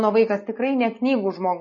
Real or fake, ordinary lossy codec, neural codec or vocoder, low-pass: real; MP3, 32 kbps; none; 7.2 kHz